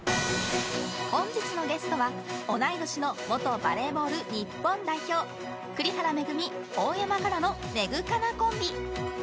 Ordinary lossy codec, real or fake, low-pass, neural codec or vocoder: none; real; none; none